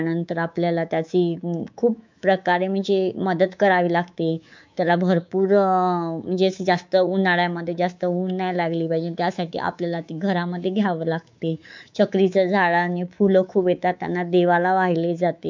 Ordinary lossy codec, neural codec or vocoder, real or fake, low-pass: MP3, 64 kbps; codec, 24 kHz, 3.1 kbps, DualCodec; fake; 7.2 kHz